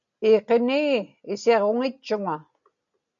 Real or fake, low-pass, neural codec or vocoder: real; 7.2 kHz; none